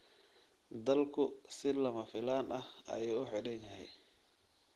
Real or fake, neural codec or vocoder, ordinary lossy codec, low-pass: real; none; Opus, 16 kbps; 14.4 kHz